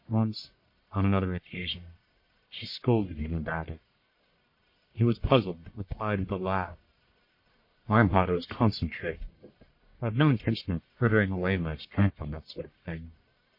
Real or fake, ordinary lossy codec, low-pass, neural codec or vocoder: fake; MP3, 32 kbps; 5.4 kHz; codec, 44.1 kHz, 1.7 kbps, Pupu-Codec